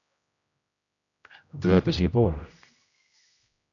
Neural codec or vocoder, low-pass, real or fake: codec, 16 kHz, 0.5 kbps, X-Codec, HuBERT features, trained on general audio; 7.2 kHz; fake